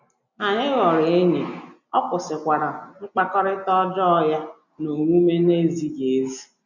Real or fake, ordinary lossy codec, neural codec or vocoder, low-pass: real; none; none; 7.2 kHz